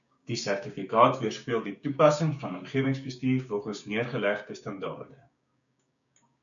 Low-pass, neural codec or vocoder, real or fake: 7.2 kHz; codec, 16 kHz, 6 kbps, DAC; fake